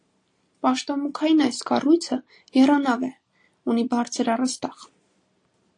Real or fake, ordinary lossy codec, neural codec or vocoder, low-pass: real; AAC, 48 kbps; none; 9.9 kHz